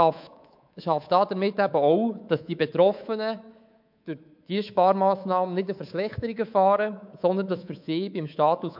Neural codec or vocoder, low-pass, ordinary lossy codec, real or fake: codec, 24 kHz, 3.1 kbps, DualCodec; 5.4 kHz; MP3, 48 kbps; fake